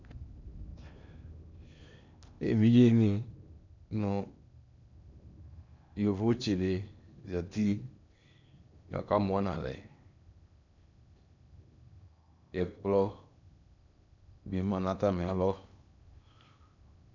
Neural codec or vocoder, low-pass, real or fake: codec, 16 kHz in and 24 kHz out, 0.8 kbps, FocalCodec, streaming, 65536 codes; 7.2 kHz; fake